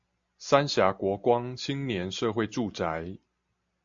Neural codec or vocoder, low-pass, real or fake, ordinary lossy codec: none; 7.2 kHz; real; AAC, 64 kbps